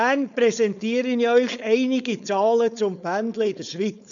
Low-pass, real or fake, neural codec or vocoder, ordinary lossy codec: 7.2 kHz; fake; codec, 16 kHz, 4.8 kbps, FACodec; none